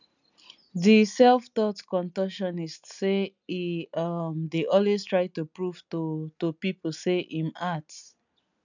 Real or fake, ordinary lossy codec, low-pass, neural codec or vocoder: real; none; 7.2 kHz; none